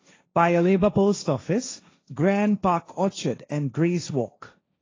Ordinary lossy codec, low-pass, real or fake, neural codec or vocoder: AAC, 32 kbps; 7.2 kHz; fake; codec, 16 kHz, 1.1 kbps, Voila-Tokenizer